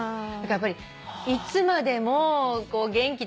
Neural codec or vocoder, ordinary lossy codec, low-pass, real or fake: none; none; none; real